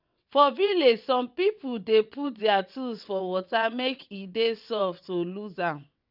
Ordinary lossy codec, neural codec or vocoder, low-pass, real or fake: none; vocoder, 22.05 kHz, 80 mel bands, WaveNeXt; 5.4 kHz; fake